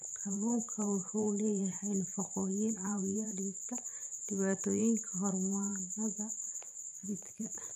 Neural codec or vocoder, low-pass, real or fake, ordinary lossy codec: vocoder, 44.1 kHz, 128 mel bands every 512 samples, BigVGAN v2; 14.4 kHz; fake; none